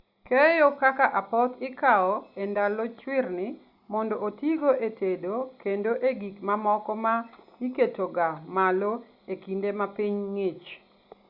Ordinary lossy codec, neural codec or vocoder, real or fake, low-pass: Opus, 64 kbps; none; real; 5.4 kHz